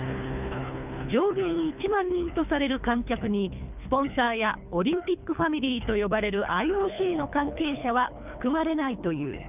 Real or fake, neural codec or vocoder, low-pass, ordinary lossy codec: fake; codec, 24 kHz, 3 kbps, HILCodec; 3.6 kHz; none